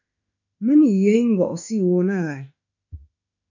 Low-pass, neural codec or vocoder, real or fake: 7.2 kHz; autoencoder, 48 kHz, 32 numbers a frame, DAC-VAE, trained on Japanese speech; fake